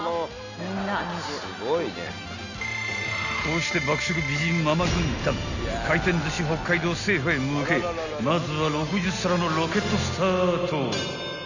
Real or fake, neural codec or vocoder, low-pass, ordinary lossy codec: real; none; 7.2 kHz; none